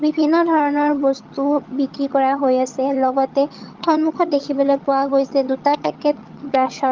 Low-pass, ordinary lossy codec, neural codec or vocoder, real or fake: 7.2 kHz; Opus, 32 kbps; vocoder, 22.05 kHz, 80 mel bands, HiFi-GAN; fake